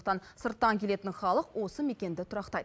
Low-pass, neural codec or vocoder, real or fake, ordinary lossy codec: none; none; real; none